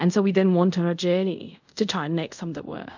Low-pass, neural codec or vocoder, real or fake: 7.2 kHz; codec, 24 kHz, 0.5 kbps, DualCodec; fake